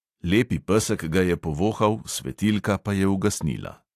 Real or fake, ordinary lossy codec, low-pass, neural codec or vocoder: real; AAC, 64 kbps; 14.4 kHz; none